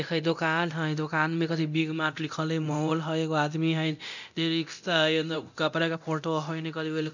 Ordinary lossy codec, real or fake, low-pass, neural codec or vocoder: none; fake; 7.2 kHz; codec, 24 kHz, 0.9 kbps, DualCodec